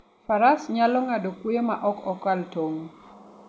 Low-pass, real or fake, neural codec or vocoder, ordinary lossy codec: none; real; none; none